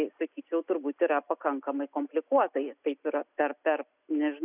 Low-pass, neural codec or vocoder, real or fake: 3.6 kHz; none; real